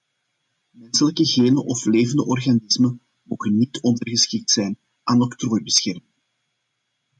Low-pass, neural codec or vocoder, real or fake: 10.8 kHz; none; real